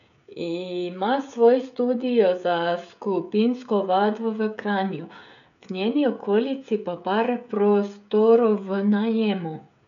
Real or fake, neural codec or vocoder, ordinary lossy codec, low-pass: fake; codec, 16 kHz, 16 kbps, FreqCodec, smaller model; none; 7.2 kHz